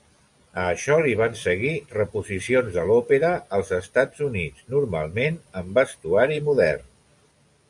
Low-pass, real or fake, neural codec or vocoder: 10.8 kHz; real; none